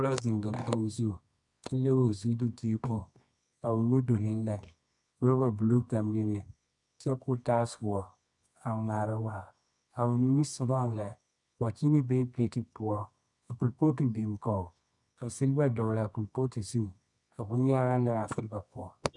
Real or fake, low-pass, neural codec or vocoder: fake; 10.8 kHz; codec, 24 kHz, 0.9 kbps, WavTokenizer, medium music audio release